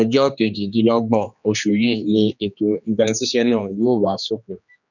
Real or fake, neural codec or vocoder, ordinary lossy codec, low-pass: fake; codec, 16 kHz, 2 kbps, X-Codec, HuBERT features, trained on general audio; none; 7.2 kHz